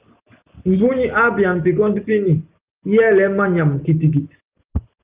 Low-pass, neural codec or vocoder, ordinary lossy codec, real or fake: 3.6 kHz; none; Opus, 24 kbps; real